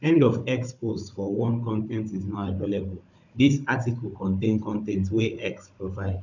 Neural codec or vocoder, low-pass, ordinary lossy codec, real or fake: codec, 16 kHz, 16 kbps, FunCodec, trained on Chinese and English, 50 frames a second; 7.2 kHz; none; fake